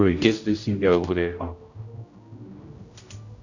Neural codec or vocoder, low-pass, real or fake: codec, 16 kHz, 0.5 kbps, X-Codec, HuBERT features, trained on general audio; 7.2 kHz; fake